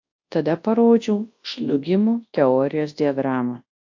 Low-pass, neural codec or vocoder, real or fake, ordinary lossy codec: 7.2 kHz; codec, 24 kHz, 0.9 kbps, WavTokenizer, large speech release; fake; AAC, 48 kbps